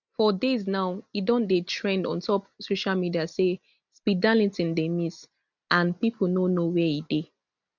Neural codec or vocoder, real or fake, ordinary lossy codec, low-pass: none; real; none; 7.2 kHz